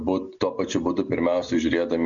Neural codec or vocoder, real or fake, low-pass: none; real; 7.2 kHz